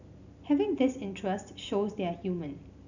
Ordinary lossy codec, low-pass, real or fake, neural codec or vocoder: none; 7.2 kHz; real; none